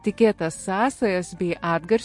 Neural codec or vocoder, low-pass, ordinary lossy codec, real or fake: none; 10.8 kHz; MP3, 48 kbps; real